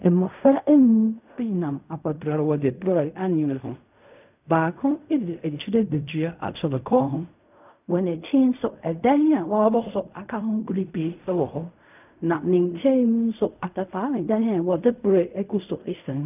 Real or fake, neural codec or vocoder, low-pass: fake; codec, 16 kHz in and 24 kHz out, 0.4 kbps, LongCat-Audio-Codec, fine tuned four codebook decoder; 3.6 kHz